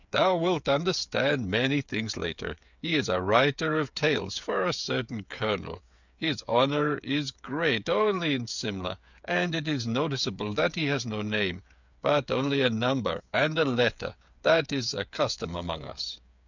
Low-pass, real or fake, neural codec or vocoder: 7.2 kHz; fake; codec, 16 kHz, 8 kbps, FreqCodec, smaller model